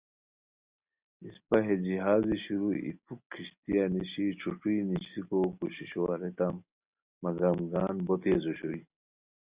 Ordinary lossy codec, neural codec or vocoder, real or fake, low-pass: Opus, 64 kbps; none; real; 3.6 kHz